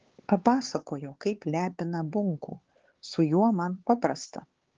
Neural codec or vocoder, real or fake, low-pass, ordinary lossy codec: codec, 16 kHz, 4 kbps, X-Codec, HuBERT features, trained on LibriSpeech; fake; 7.2 kHz; Opus, 16 kbps